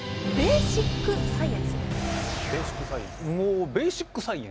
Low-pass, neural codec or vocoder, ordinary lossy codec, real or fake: none; none; none; real